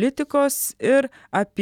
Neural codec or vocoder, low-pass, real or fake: none; 19.8 kHz; real